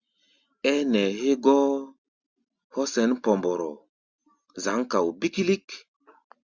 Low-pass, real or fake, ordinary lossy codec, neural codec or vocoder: 7.2 kHz; real; Opus, 64 kbps; none